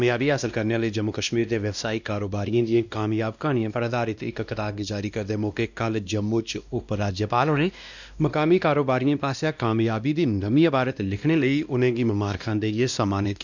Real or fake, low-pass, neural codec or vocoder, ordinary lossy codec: fake; 7.2 kHz; codec, 16 kHz, 1 kbps, X-Codec, WavLM features, trained on Multilingual LibriSpeech; none